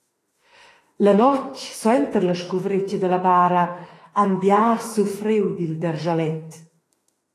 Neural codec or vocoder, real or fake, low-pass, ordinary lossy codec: autoencoder, 48 kHz, 32 numbers a frame, DAC-VAE, trained on Japanese speech; fake; 14.4 kHz; AAC, 48 kbps